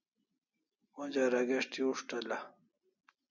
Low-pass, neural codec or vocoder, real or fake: 7.2 kHz; none; real